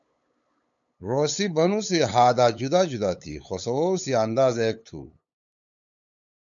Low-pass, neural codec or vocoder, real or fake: 7.2 kHz; codec, 16 kHz, 8 kbps, FunCodec, trained on LibriTTS, 25 frames a second; fake